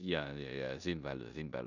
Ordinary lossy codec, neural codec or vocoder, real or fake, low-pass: none; codec, 16 kHz in and 24 kHz out, 0.9 kbps, LongCat-Audio-Codec, four codebook decoder; fake; 7.2 kHz